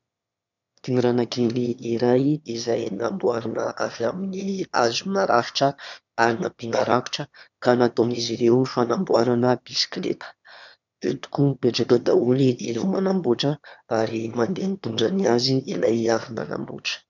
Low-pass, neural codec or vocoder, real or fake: 7.2 kHz; autoencoder, 22.05 kHz, a latent of 192 numbers a frame, VITS, trained on one speaker; fake